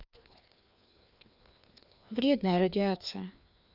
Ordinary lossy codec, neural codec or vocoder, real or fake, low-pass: none; codec, 16 kHz, 2 kbps, FreqCodec, larger model; fake; 5.4 kHz